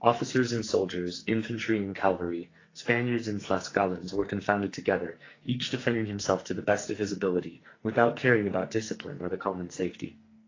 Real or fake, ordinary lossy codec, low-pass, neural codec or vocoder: fake; AAC, 32 kbps; 7.2 kHz; codec, 44.1 kHz, 2.6 kbps, SNAC